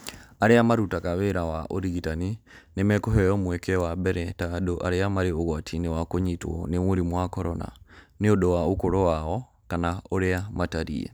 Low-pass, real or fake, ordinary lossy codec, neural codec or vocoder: none; real; none; none